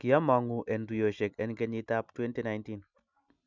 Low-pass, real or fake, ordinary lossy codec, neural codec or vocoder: 7.2 kHz; real; none; none